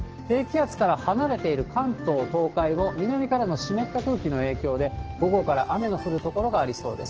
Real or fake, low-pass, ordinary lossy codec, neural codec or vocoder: fake; 7.2 kHz; Opus, 16 kbps; codec, 16 kHz, 6 kbps, DAC